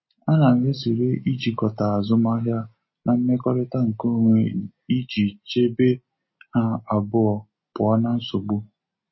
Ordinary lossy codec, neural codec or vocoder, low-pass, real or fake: MP3, 24 kbps; none; 7.2 kHz; real